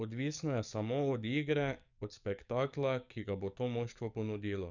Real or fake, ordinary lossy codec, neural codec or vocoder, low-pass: fake; none; codec, 44.1 kHz, 7.8 kbps, DAC; 7.2 kHz